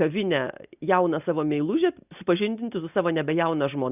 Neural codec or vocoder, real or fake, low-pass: none; real; 3.6 kHz